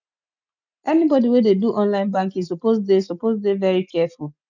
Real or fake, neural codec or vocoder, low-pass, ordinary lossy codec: real; none; 7.2 kHz; none